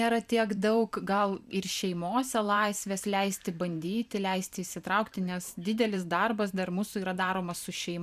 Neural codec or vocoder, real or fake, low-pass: vocoder, 44.1 kHz, 128 mel bands every 512 samples, BigVGAN v2; fake; 14.4 kHz